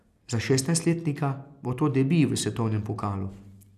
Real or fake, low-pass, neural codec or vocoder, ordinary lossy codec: real; 14.4 kHz; none; none